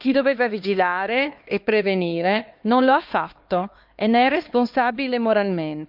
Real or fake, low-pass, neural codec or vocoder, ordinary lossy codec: fake; 5.4 kHz; codec, 16 kHz, 2 kbps, X-Codec, HuBERT features, trained on LibriSpeech; Opus, 24 kbps